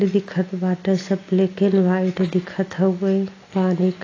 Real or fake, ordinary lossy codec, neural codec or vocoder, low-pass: real; AAC, 32 kbps; none; 7.2 kHz